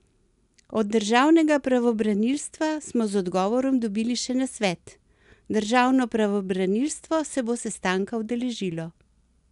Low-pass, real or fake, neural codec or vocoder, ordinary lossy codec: 10.8 kHz; real; none; none